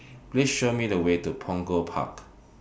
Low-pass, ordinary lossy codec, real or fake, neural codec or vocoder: none; none; real; none